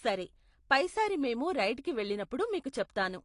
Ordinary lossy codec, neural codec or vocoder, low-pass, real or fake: AAC, 48 kbps; none; 10.8 kHz; real